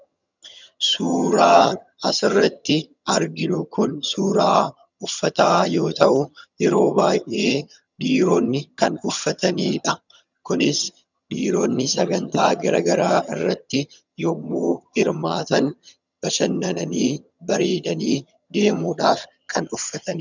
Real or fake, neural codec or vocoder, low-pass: fake; vocoder, 22.05 kHz, 80 mel bands, HiFi-GAN; 7.2 kHz